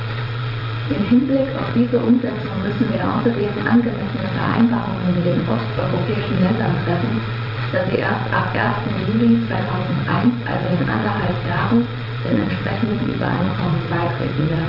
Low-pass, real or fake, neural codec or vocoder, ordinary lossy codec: 5.4 kHz; fake; vocoder, 22.05 kHz, 80 mel bands, WaveNeXt; none